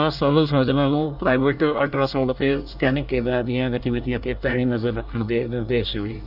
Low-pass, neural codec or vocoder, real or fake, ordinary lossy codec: 5.4 kHz; codec, 24 kHz, 1 kbps, SNAC; fake; none